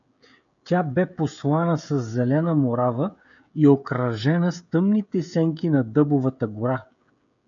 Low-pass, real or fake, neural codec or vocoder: 7.2 kHz; fake; codec, 16 kHz, 16 kbps, FreqCodec, smaller model